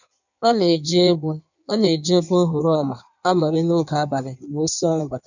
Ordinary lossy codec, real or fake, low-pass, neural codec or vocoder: none; fake; 7.2 kHz; codec, 16 kHz in and 24 kHz out, 1.1 kbps, FireRedTTS-2 codec